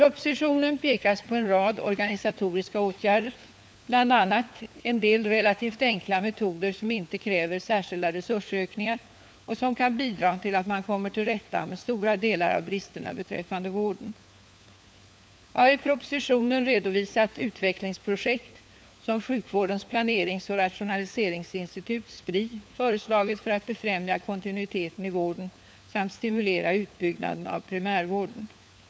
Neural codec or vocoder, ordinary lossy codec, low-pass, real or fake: codec, 16 kHz, 4 kbps, FunCodec, trained on LibriTTS, 50 frames a second; none; none; fake